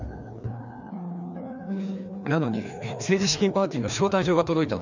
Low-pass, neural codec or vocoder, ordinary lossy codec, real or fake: 7.2 kHz; codec, 16 kHz, 2 kbps, FreqCodec, larger model; none; fake